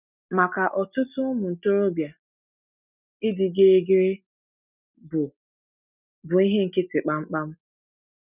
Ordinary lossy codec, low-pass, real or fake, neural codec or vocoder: none; 3.6 kHz; real; none